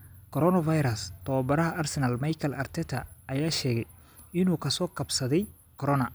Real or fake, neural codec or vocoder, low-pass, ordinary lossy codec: real; none; none; none